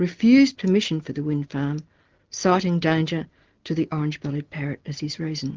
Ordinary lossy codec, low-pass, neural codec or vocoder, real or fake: Opus, 16 kbps; 7.2 kHz; none; real